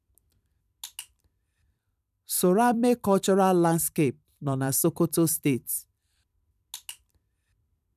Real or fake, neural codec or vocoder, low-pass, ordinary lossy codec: fake; vocoder, 44.1 kHz, 128 mel bands every 256 samples, BigVGAN v2; 14.4 kHz; none